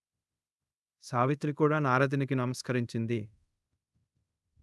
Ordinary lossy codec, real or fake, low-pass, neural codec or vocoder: none; fake; none; codec, 24 kHz, 0.5 kbps, DualCodec